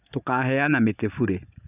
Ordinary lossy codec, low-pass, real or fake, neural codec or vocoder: none; 3.6 kHz; fake; vocoder, 24 kHz, 100 mel bands, Vocos